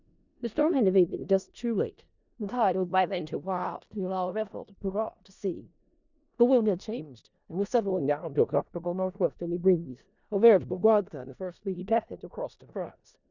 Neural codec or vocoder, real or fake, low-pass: codec, 16 kHz in and 24 kHz out, 0.4 kbps, LongCat-Audio-Codec, four codebook decoder; fake; 7.2 kHz